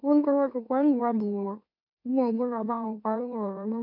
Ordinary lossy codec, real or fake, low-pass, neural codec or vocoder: none; fake; 5.4 kHz; autoencoder, 44.1 kHz, a latent of 192 numbers a frame, MeloTTS